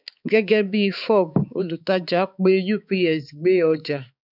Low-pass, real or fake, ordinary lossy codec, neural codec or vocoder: 5.4 kHz; fake; none; codec, 16 kHz, 4 kbps, X-Codec, HuBERT features, trained on balanced general audio